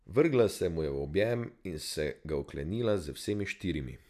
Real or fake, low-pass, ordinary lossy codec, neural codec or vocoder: real; 14.4 kHz; none; none